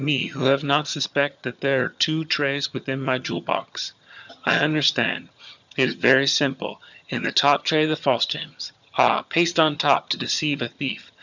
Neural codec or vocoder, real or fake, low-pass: vocoder, 22.05 kHz, 80 mel bands, HiFi-GAN; fake; 7.2 kHz